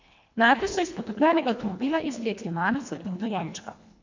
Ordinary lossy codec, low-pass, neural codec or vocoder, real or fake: MP3, 64 kbps; 7.2 kHz; codec, 24 kHz, 1.5 kbps, HILCodec; fake